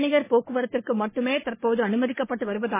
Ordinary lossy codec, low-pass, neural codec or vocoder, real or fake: MP3, 16 kbps; 3.6 kHz; codec, 44.1 kHz, 3.4 kbps, Pupu-Codec; fake